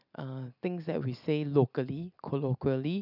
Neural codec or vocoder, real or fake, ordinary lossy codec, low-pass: none; real; none; 5.4 kHz